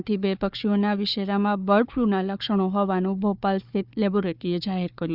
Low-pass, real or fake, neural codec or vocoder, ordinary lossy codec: 5.4 kHz; fake; codec, 16 kHz, 4 kbps, FunCodec, trained on Chinese and English, 50 frames a second; Opus, 64 kbps